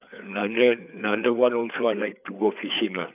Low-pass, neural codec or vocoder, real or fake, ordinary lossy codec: 3.6 kHz; codec, 16 kHz, 4 kbps, FreqCodec, larger model; fake; none